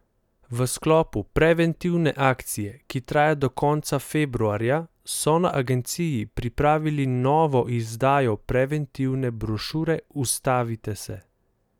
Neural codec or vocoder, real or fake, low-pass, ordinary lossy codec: none; real; 19.8 kHz; none